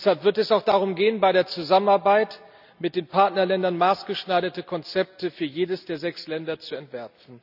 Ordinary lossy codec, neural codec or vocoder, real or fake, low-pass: none; none; real; 5.4 kHz